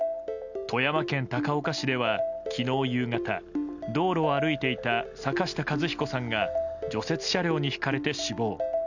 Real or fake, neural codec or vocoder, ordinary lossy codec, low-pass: real; none; none; 7.2 kHz